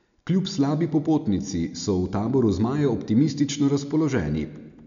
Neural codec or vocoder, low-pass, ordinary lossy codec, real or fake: none; 7.2 kHz; none; real